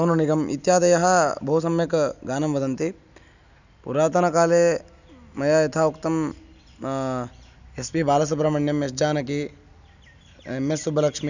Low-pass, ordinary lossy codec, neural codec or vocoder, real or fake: 7.2 kHz; none; none; real